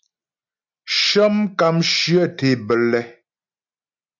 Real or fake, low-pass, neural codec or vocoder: real; 7.2 kHz; none